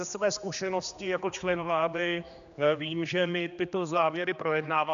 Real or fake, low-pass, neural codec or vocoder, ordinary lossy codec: fake; 7.2 kHz; codec, 16 kHz, 2 kbps, X-Codec, HuBERT features, trained on general audio; AAC, 96 kbps